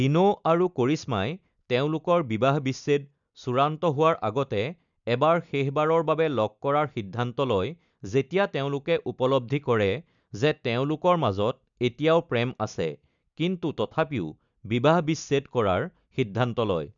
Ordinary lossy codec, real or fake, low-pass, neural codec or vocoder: none; real; 7.2 kHz; none